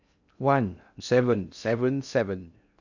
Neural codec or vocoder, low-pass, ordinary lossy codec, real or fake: codec, 16 kHz in and 24 kHz out, 0.6 kbps, FocalCodec, streaming, 2048 codes; 7.2 kHz; none; fake